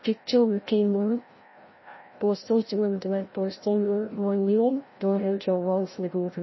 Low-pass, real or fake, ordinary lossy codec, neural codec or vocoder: 7.2 kHz; fake; MP3, 24 kbps; codec, 16 kHz, 0.5 kbps, FreqCodec, larger model